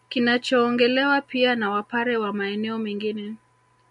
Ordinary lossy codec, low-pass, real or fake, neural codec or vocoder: MP3, 96 kbps; 10.8 kHz; real; none